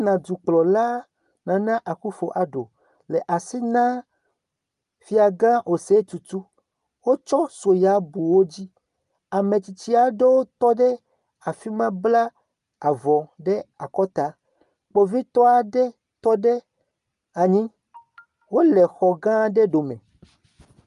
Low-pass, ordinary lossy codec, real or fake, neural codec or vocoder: 10.8 kHz; Opus, 32 kbps; real; none